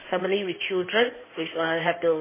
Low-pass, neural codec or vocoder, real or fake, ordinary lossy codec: 3.6 kHz; codec, 16 kHz in and 24 kHz out, 2.2 kbps, FireRedTTS-2 codec; fake; MP3, 16 kbps